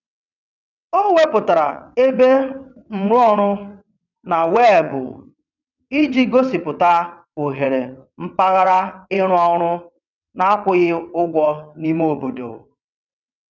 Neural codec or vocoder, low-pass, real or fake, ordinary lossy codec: vocoder, 22.05 kHz, 80 mel bands, WaveNeXt; 7.2 kHz; fake; none